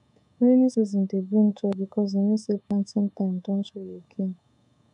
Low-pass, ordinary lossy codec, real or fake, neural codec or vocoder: 10.8 kHz; none; fake; codec, 24 kHz, 3.1 kbps, DualCodec